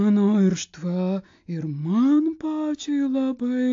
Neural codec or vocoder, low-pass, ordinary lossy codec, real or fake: none; 7.2 kHz; AAC, 48 kbps; real